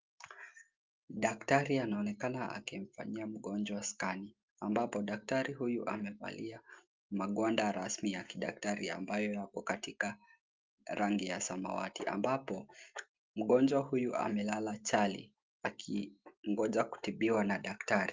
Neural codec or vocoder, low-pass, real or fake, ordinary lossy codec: none; 7.2 kHz; real; Opus, 32 kbps